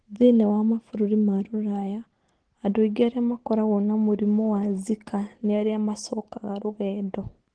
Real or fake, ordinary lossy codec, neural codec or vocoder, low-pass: real; Opus, 16 kbps; none; 9.9 kHz